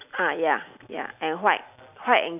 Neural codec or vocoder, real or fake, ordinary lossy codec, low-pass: none; real; none; 3.6 kHz